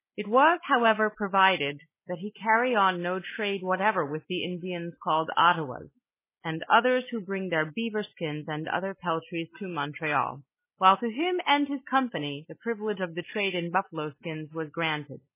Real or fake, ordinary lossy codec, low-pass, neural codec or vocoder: real; MP3, 16 kbps; 3.6 kHz; none